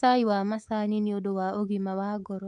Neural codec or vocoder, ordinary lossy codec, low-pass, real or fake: codec, 24 kHz, 3.1 kbps, DualCodec; MP3, 64 kbps; 10.8 kHz; fake